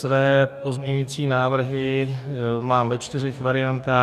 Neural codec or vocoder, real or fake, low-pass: codec, 44.1 kHz, 2.6 kbps, DAC; fake; 14.4 kHz